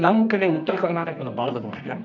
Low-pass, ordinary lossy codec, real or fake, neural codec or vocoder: 7.2 kHz; none; fake; codec, 24 kHz, 0.9 kbps, WavTokenizer, medium music audio release